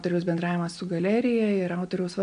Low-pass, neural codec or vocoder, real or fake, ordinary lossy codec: 9.9 kHz; none; real; MP3, 64 kbps